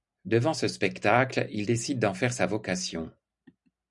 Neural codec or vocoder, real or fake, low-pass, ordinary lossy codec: none; real; 10.8 kHz; MP3, 96 kbps